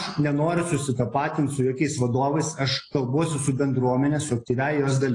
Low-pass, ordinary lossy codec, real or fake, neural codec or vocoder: 10.8 kHz; AAC, 32 kbps; real; none